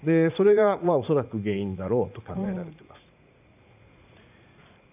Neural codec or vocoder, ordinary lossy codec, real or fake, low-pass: vocoder, 44.1 kHz, 80 mel bands, Vocos; none; fake; 3.6 kHz